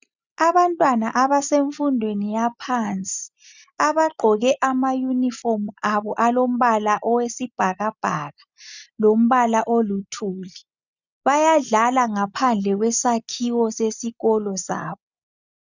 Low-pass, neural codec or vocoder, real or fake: 7.2 kHz; none; real